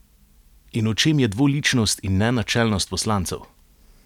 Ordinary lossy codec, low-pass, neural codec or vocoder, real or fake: none; 19.8 kHz; none; real